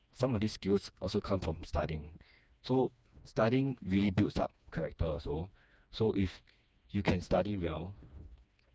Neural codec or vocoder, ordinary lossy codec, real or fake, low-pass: codec, 16 kHz, 2 kbps, FreqCodec, smaller model; none; fake; none